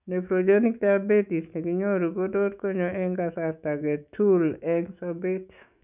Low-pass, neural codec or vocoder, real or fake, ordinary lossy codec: 3.6 kHz; autoencoder, 48 kHz, 128 numbers a frame, DAC-VAE, trained on Japanese speech; fake; none